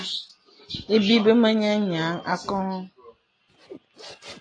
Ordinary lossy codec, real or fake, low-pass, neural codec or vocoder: AAC, 32 kbps; real; 9.9 kHz; none